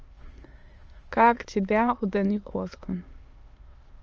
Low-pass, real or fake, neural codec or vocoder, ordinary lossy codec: 7.2 kHz; fake; autoencoder, 22.05 kHz, a latent of 192 numbers a frame, VITS, trained on many speakers; Opus, 24 kbps